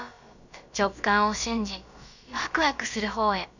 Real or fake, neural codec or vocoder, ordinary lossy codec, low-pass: fake; codec, 16 kHz, about 1 kbps, DyCAST, with the encoder's durations; none; 7.2 kHz